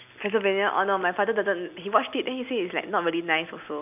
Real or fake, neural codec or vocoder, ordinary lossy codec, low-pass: real; none; none; 3.6 kHz